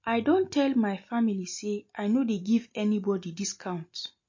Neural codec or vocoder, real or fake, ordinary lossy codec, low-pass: none; real; MP3, 32 kbps; 7.2 kHz